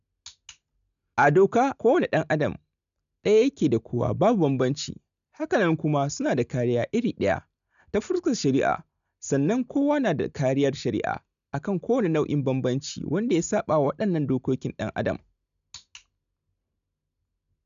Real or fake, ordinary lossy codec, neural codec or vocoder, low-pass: real; none; none; 7.2 kHz